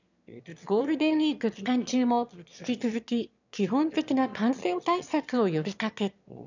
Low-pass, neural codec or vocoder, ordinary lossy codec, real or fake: 7.2 kHz; autoencoder, 22.05 kHz, a latent of 192 numbers a frame, VITS, trained on one speaker; none; fake